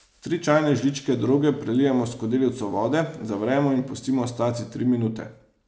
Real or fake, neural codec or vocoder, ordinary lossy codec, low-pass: real; none; none; none